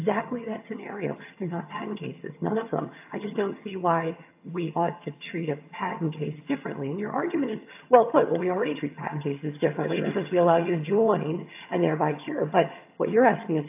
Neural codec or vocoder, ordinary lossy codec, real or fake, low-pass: vocoder, 22.05 kHz, 80 mel bands, HiFi-GAN; AAC, 32 kbps; fake; 3.6 kHz